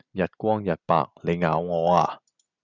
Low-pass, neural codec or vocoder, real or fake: 7.2 kHz; none; real